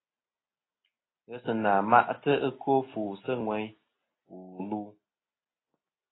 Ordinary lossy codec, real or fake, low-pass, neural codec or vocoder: AAC, 16 kbps; real; 7.2 kHz; none